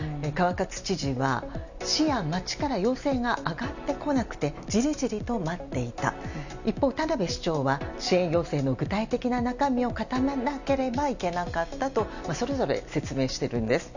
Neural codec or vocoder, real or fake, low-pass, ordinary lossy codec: none; real; 7.2 kHz; none